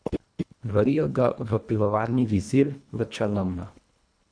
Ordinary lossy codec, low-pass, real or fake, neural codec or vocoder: MP3, 96 kbps; 9.9 kHz; fake; codec, 24 kHz, 1.5 kbps, HILCodec